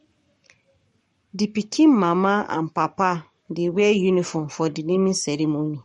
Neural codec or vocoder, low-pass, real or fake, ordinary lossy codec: codec, 44.1 kHz, 7.8 kbps, Pupu-Codec; 19.8 kHz; fake; MP3, 48 kbps